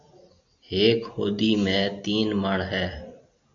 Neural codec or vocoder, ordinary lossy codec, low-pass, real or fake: none; AAC, 64 kbps; 7.2 kHz; real